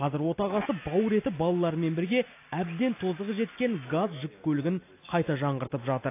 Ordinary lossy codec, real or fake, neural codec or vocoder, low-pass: AAC, 24 kbps; real; none; 3.6 kHz